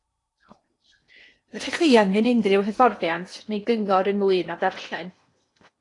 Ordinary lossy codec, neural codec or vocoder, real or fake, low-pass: AAC, 48 kbps; codec, 16 kHz in and 24 kHz out, 0.8 kbps, FocalCodec, streaming, 65536 codes; fake; 10.8 kHz